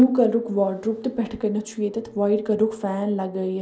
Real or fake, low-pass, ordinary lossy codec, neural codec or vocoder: real; none; none; none